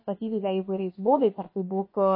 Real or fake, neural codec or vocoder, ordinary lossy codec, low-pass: fake; codec, 16 kHz, about 1 kbps, DyCAST, with the encoder's durations; MP3, 24 kbps; 5.4 kHz